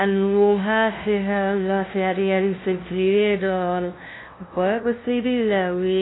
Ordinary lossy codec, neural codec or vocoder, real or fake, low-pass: AAC, 16 kbps; codec, 16 kHz, 0.5 kbps, FunCodec, trained on LibriTTS, 25 frames a second; fake; 7.2 kHz